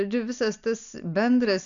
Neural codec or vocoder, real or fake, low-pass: none; real; 7.2 kHz